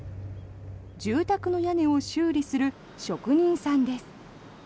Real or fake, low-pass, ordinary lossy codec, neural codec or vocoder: real; none; none; none